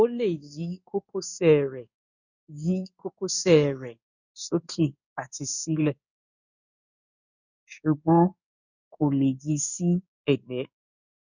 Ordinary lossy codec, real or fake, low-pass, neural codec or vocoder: none; fake; 7.2 kHz; codec, 16 kHz in and 24 kHz out, 1 kbps, XY-Tokenizer